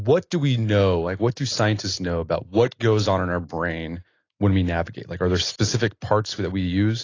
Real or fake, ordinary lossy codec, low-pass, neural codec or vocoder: real; AAC, 32 kbps; 7.2 kHz; none